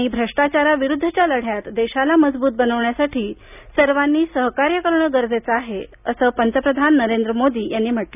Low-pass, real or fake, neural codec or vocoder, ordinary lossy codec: 3.6 kHz; real; none; none